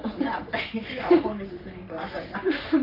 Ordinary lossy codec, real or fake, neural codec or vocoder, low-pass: none; fake; codec, 44.1 kHz, 3.4 kbps, Pupu-Codec; 5.4 kHz